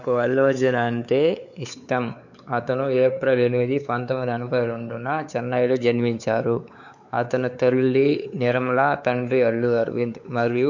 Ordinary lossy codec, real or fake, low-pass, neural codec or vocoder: none; fake; 7.2 kHz; codec, 16 kHz, 4 kbps, X-Codec, WavLM features, trained on Multilingual LibriSpeech